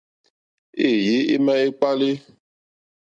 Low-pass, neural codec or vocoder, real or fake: 9.9 kHz; none; real